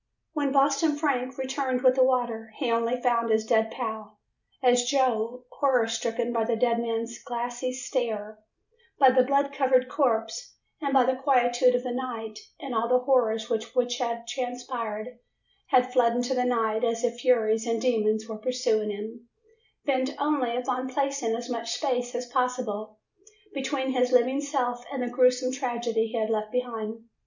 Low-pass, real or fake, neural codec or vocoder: 7.2 kHz; real; none